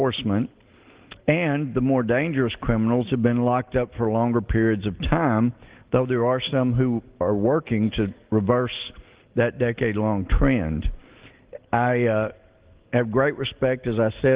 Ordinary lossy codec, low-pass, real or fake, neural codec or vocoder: Opus, 16 kbps; 3.6 kHz; real; none